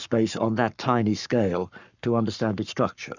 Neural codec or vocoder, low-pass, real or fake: codec, 44.1 kHz, 7.8 kbps, Pupu-Codec; 7.2 kHz; fake